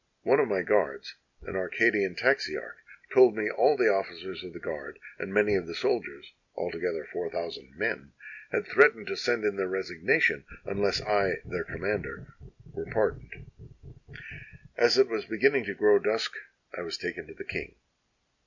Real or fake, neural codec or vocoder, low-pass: real; none; 7.2 kHz